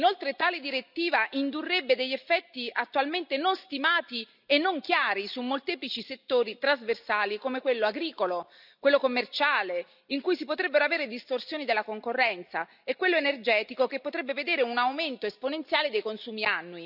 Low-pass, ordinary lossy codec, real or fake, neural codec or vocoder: 5.4 kHz; none; real; none